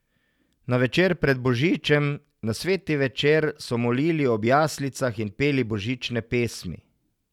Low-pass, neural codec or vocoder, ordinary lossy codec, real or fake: 19.8 kHz; none; none; real